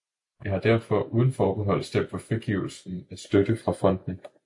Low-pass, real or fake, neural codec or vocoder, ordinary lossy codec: 10.8 kHz; real; none; AAC, 64 kbps